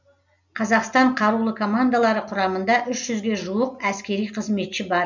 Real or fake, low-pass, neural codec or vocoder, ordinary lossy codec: real; 7.2 kHz; none; none